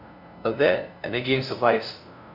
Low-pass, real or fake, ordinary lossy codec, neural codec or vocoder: 5.4 kHz; fake; none; codec, 16 kHz, 0.5 kbps, FunCodec, trained on LibriTTS, 25 frames a second